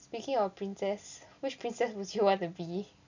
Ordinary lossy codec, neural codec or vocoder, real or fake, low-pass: none; none; real; 7.2 kHz